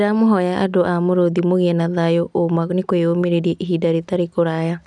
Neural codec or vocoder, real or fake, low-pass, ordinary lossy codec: none; real; 10.8 kHz; none